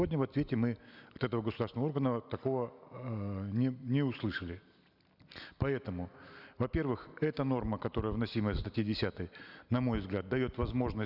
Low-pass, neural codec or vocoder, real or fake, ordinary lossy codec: 5.4 kHz; none; real; none